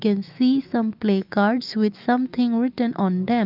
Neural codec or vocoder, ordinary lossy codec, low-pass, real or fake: autoencoder, 48 kHz, 128 numbers a frame, DAC-VAE, trained on Japanese speech; Opus, 32 kbps; 5.4 kHz; fake